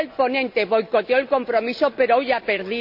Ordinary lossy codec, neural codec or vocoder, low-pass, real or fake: none; none; 5.4 kHz; real